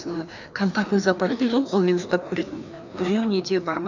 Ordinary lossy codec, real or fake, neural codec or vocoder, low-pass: none; fake; codec, 16 kHz, 2 kbps, FreqCodec, larger model; 7.2 kHz